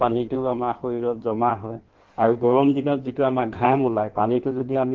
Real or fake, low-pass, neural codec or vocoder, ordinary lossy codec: fake; 7.2 kHz; codec, 16 kHz in and 24 kHz out, 1.1 kbps, FireRedTTS-2 codec; Opus, 16 kbps